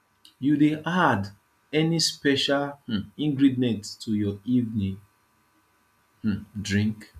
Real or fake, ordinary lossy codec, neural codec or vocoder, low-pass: real; none; none; 14.4 kHz